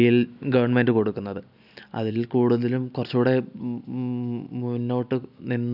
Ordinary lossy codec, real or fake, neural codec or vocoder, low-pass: none; real; none; 5.4 kHz